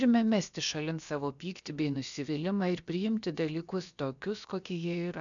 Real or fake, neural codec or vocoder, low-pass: fake; codec, 16 kHz, about 1 kbps, DyCAST, with the encoder's durations; 7.2 kHz